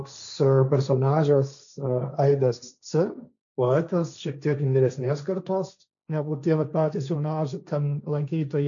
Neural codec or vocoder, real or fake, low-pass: codec, 16 kHz, 1.1 kbps, Voila-Tokenizer; fake; 7.2 kHz